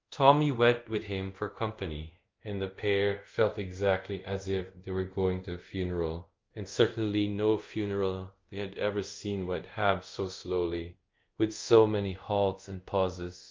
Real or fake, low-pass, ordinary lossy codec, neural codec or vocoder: fake; 7.2 kHz; Opus, 32 kbps; codec, 24 kHz, 0.5 kbps, DualCodec